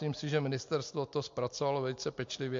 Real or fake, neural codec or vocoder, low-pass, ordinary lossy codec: real; none; 7.2 kHz; MP3, 48 kbps